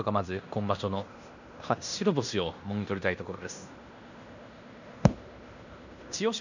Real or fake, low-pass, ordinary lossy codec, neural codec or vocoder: fake; 7.2 kHz; none; codec, 16 kHz in and 24 kHz out, 0.9 kbps, LongCat-Audio-Codec, fine tuned four codebook decoder